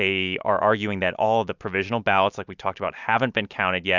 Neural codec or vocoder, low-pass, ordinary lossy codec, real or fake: none; 7.2 kHz; Opus, 64 kbps; real